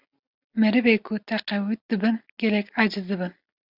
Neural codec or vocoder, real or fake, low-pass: none; real; 5.4 kHz